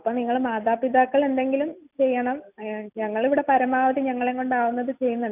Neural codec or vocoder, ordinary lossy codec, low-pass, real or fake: none; none; 3.6 kHz; real